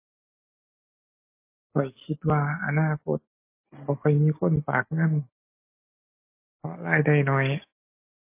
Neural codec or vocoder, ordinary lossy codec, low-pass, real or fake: none; MP3, 24 kbps; 3.6 kHz; real